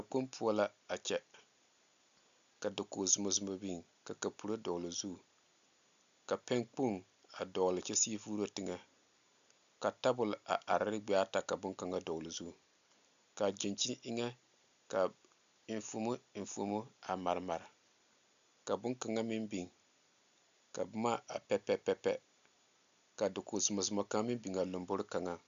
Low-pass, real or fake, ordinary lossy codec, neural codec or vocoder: 7.2 kHz; real; MP3, 96 kbps; none